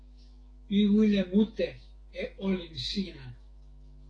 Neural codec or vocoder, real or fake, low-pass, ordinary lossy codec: autoencoder, 48 kHz, 128 numbers a frame, DAC-VAE, trained on Japanese speech; fake; 9.9 kHz; AAC, 32 kbps